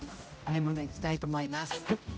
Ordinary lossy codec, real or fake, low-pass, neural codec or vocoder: none; fake; none; codec, 16 kHz, 0.5 kbps, X-Codec, HuBERT features, trained on general audio